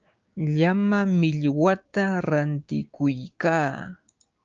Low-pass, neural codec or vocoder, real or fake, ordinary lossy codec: 7.2 kHz; codec, 16 kHz, 6 kbps, DAC; fake; Opus, 24 kbps